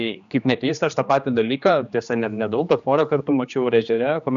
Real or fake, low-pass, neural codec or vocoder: fake; 7.2 kHz; codec, 16 kHz, 2 kbps, X-Codec, HuBERT features, trained on general audio